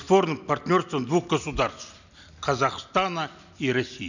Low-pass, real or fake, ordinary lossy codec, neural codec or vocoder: 7.2 kHz; real; none; none